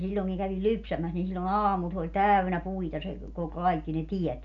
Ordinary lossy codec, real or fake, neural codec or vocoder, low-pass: MP3, 96 kbps; real; none; 7.2 kHz